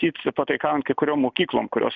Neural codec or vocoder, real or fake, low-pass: none; real; 7.2 kHz